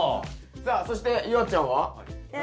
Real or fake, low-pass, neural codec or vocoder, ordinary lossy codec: real; none; none; none